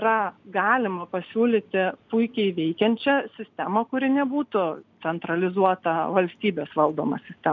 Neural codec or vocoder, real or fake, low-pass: none; real; 7.2 kHz